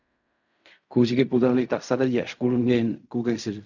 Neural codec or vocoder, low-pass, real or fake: codec, 16 kHz in and 24 kHz out, 0.4 kbps, LongCat-Audio-Codec, fine tuned four codebook decoder; 7.2 kHz; fake